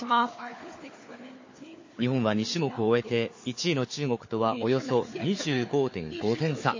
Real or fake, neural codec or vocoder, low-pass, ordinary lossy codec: fake; codec, 16 kHz, 4 kbps, FunCodec, trained on Chinese and English, 50 frames a second; 7.2 kHz; MP3, 32 kbps